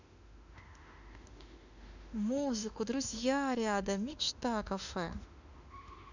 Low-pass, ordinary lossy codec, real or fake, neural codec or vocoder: 7.2 kHz; none; fake; autoencoder, 48 kHz, 32 numbers a frame, DAC-VAE, trained on Japanese speech